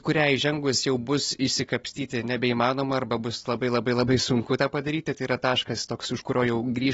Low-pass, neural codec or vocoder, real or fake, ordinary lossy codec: 19.8 kHz; none; real; AAC, 24 kbps